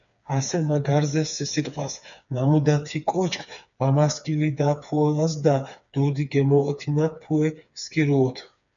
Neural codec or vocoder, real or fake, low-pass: codec, 16 kHz, 4 kbps, FreqCodec, smaller model; fake; 7.2 kHz